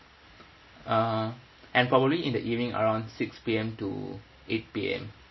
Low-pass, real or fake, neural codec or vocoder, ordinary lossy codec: 7.2 kHz; real; none; MP3, 24 kbps